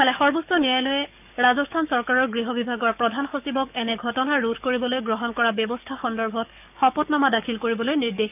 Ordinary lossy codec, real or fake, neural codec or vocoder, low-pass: none; fake; codec, 44.1 kHz, 7.8 kbps, DAC; 3.6 kHz